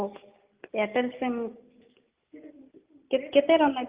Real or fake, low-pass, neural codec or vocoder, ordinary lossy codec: real; 3.6 kHz; none; Opus, 24 kbps